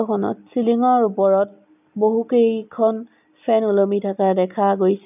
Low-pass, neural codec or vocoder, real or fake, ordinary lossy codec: 3.6 kHz; none; real; none